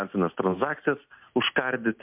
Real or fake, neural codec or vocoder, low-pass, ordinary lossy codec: real; none; 3.6 kHz; MP3, 32 kbps